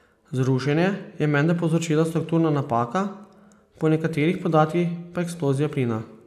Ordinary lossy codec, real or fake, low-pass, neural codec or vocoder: AAC, 96 kbps; real; 14.4 kHz; none